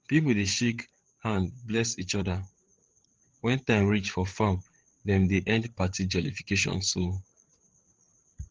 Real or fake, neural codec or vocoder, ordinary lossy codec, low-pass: fake; codec, 16 kHz, 8 kbps, FreqCodec, larger model; Opus, 16 kbps; 7.2 kHz